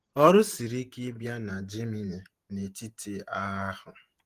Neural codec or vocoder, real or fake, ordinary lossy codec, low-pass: vocoder, 48 kHz, 128 mel bands, Vocos; fake; Opus, 24 kbps; 14.4 kHz